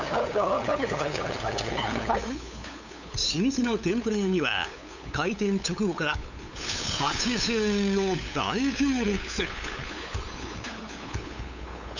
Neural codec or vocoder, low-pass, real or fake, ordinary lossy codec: codec, 16 kHz, 8 kbps, FunCodec, trained on LibriTTS, 25 frames a second; 7.2 kHz; fake; none